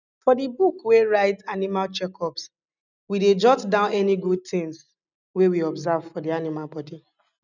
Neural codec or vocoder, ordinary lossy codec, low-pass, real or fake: none; none; 7.2 kHz; real